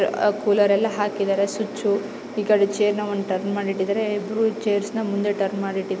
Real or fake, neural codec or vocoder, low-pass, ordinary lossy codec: real; none; none; none